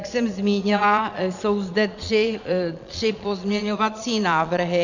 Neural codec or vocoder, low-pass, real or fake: vocoder, 22.05 kHz, 80 mel bands, Vocos; 7.2 kHz; fake